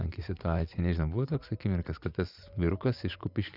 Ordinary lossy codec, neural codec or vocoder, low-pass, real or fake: Opus, 64 kbps; vocoder, 24 kHz, 100 mel bands, Vocos; 5.4 kHz; fake